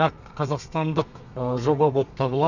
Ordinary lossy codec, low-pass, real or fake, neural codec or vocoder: none; 7.2 kHz; fake; codec, 32 kHz, 1.9 kbps, SNAC